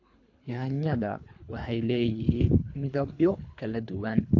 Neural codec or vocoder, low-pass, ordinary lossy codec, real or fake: codec, 24 kHz, 3 kbps, HILCodec; 7.2 kHz; AAC, 48 kbps; fake